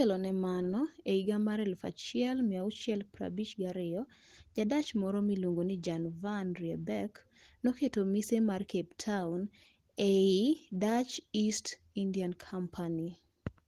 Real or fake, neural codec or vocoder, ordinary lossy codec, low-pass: real; none; Opus, 16 kbps; 14.4 kHz